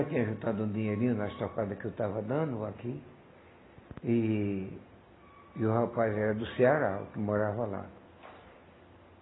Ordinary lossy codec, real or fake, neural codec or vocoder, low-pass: AAC, 16 kbps; real; none; 7.2 kHz